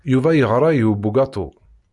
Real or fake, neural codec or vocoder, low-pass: real; none; 10.8 kHz